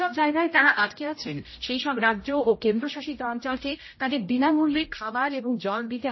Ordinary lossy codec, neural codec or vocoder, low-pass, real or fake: MP3, 24 kbps; codec, 16 kHz, 0.5 kbps, X-Codec, HuBERT features, trained on general audio; 7.2 kHz; fake